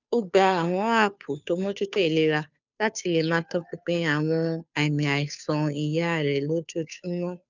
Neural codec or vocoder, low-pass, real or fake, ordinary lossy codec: codec, 16 kHz, 2 kbps, FunCodec, trained on Chinese and English, 25 frames a second; 7.2 kHz; fake; none